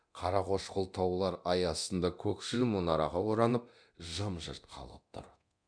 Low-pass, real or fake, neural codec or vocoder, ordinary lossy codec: 9.9 kHz; fake; codec, 24 kHz, 0.9 kbps, DualCodec; none